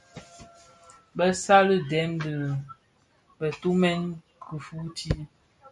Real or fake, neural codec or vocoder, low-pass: real; none; 10.8 kHz